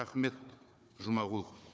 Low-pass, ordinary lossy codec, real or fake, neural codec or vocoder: none; none; fake; codec, 16 kHz, 4 kbps, FunCodec, trained on Chinese and English, 50 frames a second